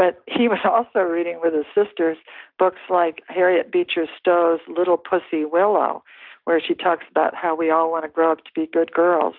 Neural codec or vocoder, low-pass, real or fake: none; 5.4 kHz; real